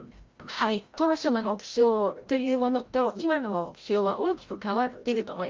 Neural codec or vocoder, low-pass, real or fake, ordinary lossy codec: codec, 16 kHz, 0.5 kbps, FreqCodec, larger model; 7.2 kHz; fake; Opus, 32 kbps